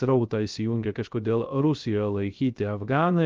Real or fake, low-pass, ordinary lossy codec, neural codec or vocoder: fake; 7.2 kHz; Opus, 32 kbps; codec, 16 kHz, 0.3 kbps, FocalCodec